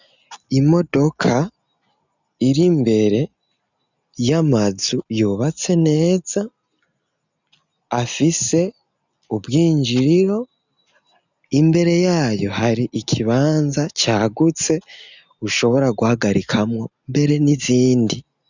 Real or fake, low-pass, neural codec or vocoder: real; 7.2 kHz; none